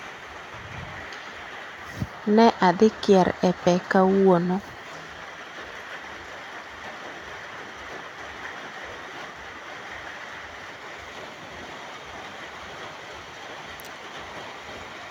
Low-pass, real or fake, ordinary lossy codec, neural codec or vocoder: 19.8 kHz; fake; none; vocoder, 44.1 kHz, 128 mel bands every 256 samples, BigVGAN v2